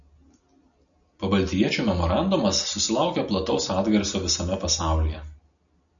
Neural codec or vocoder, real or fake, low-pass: none; real; 7.2 kHz